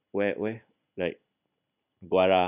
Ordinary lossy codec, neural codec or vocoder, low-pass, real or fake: none; none; 3.6 kHz; real